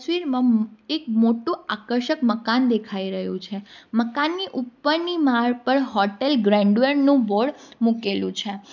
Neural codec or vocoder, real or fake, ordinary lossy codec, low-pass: none; real; none; 7.2 kHz